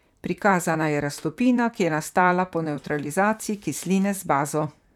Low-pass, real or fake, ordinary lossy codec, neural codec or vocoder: 19.8 kHz; fake; none; vocoder, 44.1 kHz, 128 mel bands, Pupu-Vocoder